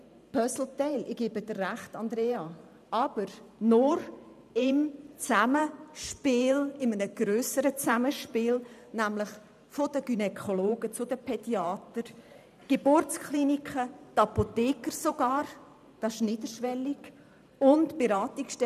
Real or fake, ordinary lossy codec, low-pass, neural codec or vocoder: fake; none; 14.4 kHz; vocoder, 44.1 kHz, 128 mel bands every 512 samples, BigVGAN v2